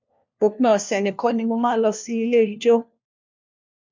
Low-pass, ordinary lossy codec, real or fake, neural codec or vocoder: 7.2 kHz; MP3, 64 kbps; fake; codec, 16 kHz, 1 kbps, FunCodec, trained on LibriTTS, 50 frames a second